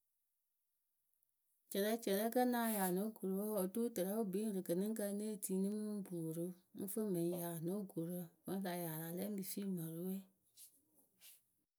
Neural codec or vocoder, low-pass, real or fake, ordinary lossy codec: none; none; real; none